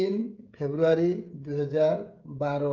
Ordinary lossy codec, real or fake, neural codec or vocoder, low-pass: Opus, 24 kbps; fake; codec, 16 kHz, 16 kbps, FreqCodec, smaller model; 7.2 kHz